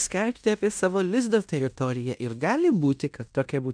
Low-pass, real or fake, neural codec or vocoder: 9.9 kHz; fake; codec, 16 kHz in and 24 kHz out, 0.9 kbps, LongCat-Audio-Codec, fine tuned four codebook decoder